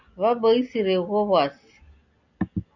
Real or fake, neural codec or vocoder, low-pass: real; none; 7.2 kHz